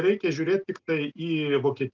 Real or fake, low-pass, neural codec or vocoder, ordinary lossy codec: real; 7.2 kHz; none; Opus, 32 kbps